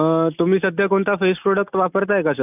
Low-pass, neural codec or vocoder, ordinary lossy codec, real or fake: 3.6 kHz; none; none; real